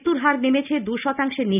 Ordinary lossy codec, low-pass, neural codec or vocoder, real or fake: none; 3.6 kHz; none; real